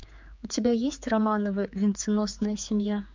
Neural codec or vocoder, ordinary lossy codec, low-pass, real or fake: codec, 16 kHz, 4 kbps, X-Codec, HuBERT features, trained on general audio; MP3, 64 kbps; 7.2 kHz; fake